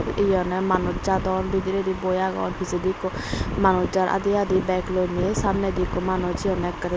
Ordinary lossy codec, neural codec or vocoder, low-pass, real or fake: none; none; none; real